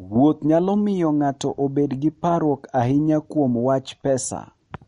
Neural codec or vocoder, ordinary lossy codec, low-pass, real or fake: none; MP3, 48 kbps; 19.8 kHz; real